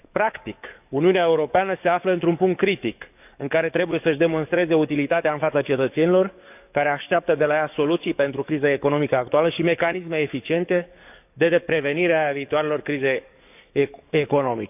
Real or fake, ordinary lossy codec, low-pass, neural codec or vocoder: fake; none; 3.6 kHz; codec, 44.1 kHz, 7.8 kbps, DAC